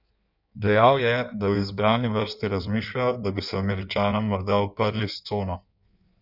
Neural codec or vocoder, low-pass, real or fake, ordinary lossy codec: codec, 16 kHz in and 24 kHz out, 1.1 kbps, FireRedTTS-2 codec; 5.4 kHz; fake; none